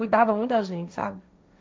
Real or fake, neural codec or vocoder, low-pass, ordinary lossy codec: fake; codec, 16 kHz, 1.1 kbps, Voila-Tokenizer; none; none